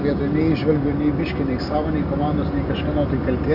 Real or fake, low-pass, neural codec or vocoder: real; 5.4 kHz; none